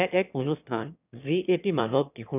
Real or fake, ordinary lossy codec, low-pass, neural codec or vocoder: fake; none; 3.6 kHz; autoencoder, 22.05 kHz, a latent of 192 numbers a frame, VITS, trained on one speaker